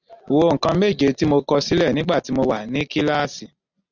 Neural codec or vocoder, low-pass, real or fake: none; 7.2 kHz; real